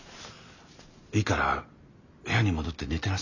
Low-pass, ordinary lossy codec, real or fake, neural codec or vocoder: 7.2 kHz; none; real; none